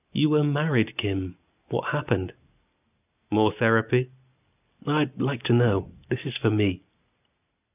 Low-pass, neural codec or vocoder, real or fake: 3.6 kHz; none; real